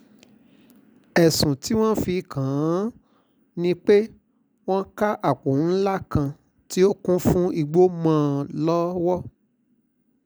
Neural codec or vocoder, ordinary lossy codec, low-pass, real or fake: none; none; none; real